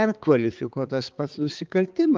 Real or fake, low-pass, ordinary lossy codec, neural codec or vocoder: fake; 7.2 kHz; Opus, 32 kbps; codec, 16 kHz, 4 kbps, X-Codec, HuBERT features, trained on balanced general audio